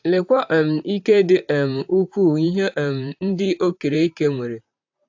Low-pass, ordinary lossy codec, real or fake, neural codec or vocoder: 7.2 kHz; AAC, 48 kbps; fake; vocoder, 44.1 kHz, 128 mel bands, Pupu-Vocoder